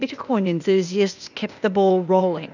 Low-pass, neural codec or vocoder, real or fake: 7.2 kHz; codec, 16 kHz, 0.8 kbps, ZipCodec; fake